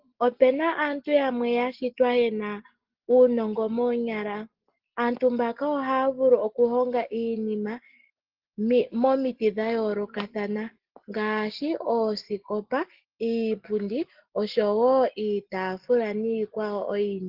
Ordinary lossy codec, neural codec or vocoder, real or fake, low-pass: Opus, 16 kbps; none; real; 5.4 kHz